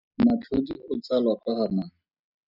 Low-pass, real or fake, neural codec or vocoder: 5.4 kHz; real; none